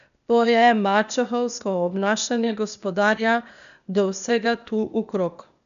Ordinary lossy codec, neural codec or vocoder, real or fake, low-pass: none; codec, 16 kHz, 0.8 kbps, ZipCodec; fake; 7.2 kHz